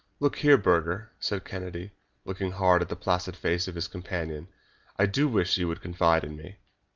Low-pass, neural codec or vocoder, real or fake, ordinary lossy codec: 7.2 kHz; none; real; Opus, 32 kbps